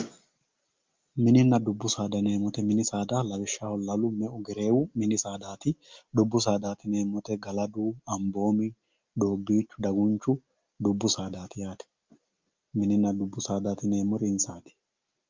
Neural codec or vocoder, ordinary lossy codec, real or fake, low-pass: none; Opus, 24 kbps; real; 7.2 kHz